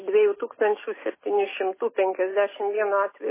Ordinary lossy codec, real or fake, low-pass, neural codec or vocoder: MP3, 16 kbps; real; 3.6 kHz; none